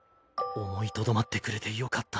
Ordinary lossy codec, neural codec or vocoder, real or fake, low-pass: none; none; real; none